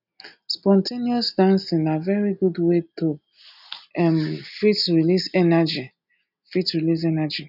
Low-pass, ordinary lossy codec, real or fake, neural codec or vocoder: 5.4 kHz; none; real; none